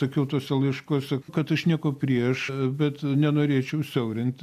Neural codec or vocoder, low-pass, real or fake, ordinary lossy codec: none; 14.4 kHz; real; AAC, 96 kbps